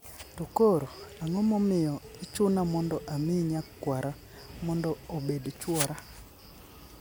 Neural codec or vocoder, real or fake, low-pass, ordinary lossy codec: none; real; none; none